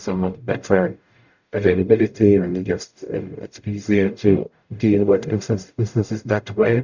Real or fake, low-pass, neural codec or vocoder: fake; 7.2 kHz; codec, 44.1 kHz, 0.9 kbps, DAC